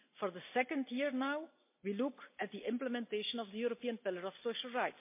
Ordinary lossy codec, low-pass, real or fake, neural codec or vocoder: MP3, 24 kbps; 3.6 kHz; real; none